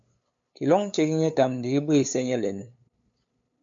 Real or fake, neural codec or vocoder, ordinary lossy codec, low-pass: fake; codec, 16 kHz, 8 kbps, FunCodec, trained on LibriTTS, 25 frames a second; MP3, 48 kbps; 7.2 kHz